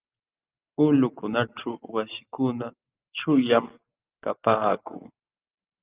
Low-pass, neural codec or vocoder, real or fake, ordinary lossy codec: 3.6 kHz; none; real; Opus, 24 kbps